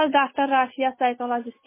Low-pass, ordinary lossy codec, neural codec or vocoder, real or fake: 3.6 kHz; MP3, 16 kbps; none; real